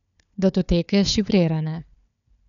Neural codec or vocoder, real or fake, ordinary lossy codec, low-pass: codec, 16 kHz, 4 kbps, FunCodec, trained on Chinese and English, 50 frames a second; fake; none; 7.2 kHz